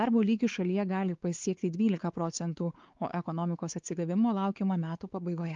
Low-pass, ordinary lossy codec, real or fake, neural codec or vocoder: 7.2 kHz; Opus, 32 kbps; fake; codec, 16 kHz, 4 kbps, X-Codec, WavLM features, trained on Multilingual LibriSpeech